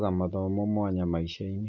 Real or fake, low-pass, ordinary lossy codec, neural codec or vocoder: real; 7.2 kHz; none; none